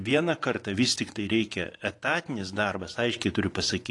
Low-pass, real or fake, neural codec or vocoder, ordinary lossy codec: 10.8 kHz; fake; vocoder, 24 kHz, 100 mel bands, Vocos; AAC, 48 kbps